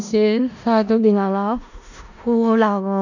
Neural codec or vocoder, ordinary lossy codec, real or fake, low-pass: codec, 16 kHz in and 24 kHz out, 0.4 kbps, LongCat-Audio-Codec, four codebook decoder; none; fake; 7.2 kHz